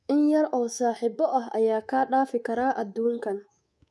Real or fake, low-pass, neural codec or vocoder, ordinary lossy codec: fake; none; codec, 24 kHz, 3.1 kbps, DualCodec; none